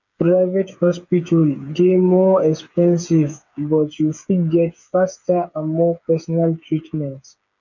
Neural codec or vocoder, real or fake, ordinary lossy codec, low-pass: codec, 16 kHz, 8 kbps, FreqCodec, smaller model; fake; none; 7.2 kHz